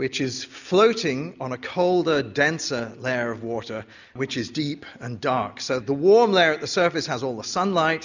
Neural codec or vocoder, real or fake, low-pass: none; real; 7.2 kHz